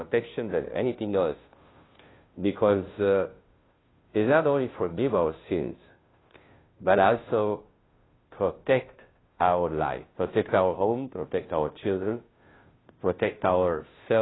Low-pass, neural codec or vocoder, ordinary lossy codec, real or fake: 7.2 kHz; codec, 16 kHz, 0.5 kbps, FunCodec, trained on Chinese and English, 25 frames a second; AAC, 16 kbps; fake